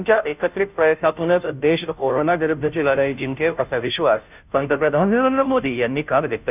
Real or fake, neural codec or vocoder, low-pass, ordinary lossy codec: fake; codec, 16 kHz, 0.5 kbps, FunCodec, trained on Chinese and English, 25 frames a second; 3.6 kHz; none